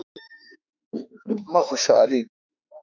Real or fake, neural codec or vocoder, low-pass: fake; autoencoder, 48 kHz, 32 numbers a frame, DAC-VAE, trained on Japanese speech; 7.2 kHz